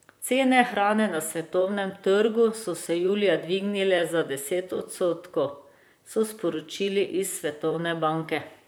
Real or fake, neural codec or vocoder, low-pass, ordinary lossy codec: fake; vocoder, 44.1 kHz, 128 mel bands, Pupu-Vocoder; none; none